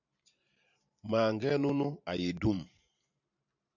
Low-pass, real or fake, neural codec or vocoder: 7.2 kHz; fake; vocoder, 44.1 kHz, 128 mel bands every 512 samples, BigVGAN v2